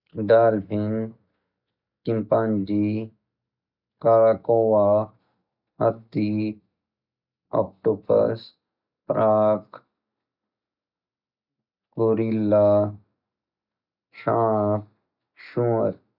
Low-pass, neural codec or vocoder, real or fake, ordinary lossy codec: 5.4 kHz; none; real; none